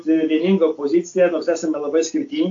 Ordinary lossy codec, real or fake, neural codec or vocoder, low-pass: AAC, 48 kbps; real; none; 7.2 kHz